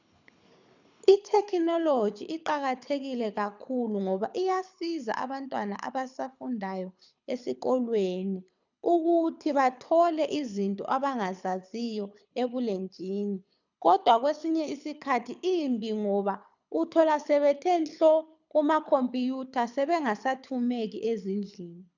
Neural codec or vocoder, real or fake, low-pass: codec, 24 kHz, 6 kbps, HILCodec; fake; 7.2 kHz